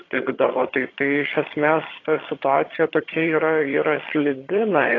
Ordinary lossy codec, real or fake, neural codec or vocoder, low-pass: AAC, 32 kbps; fake; vocoder, 22.05 kHz, 80 mel bands, HiFi-GAN; 7.2 kHz